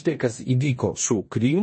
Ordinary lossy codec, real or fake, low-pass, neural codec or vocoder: MP3, 32 kbps; fake; 9.9 kHz; codec, 16 kHz in and 24 kHz out, 0.9 kbps, LongCat-Audio-Codec, four codebook decoder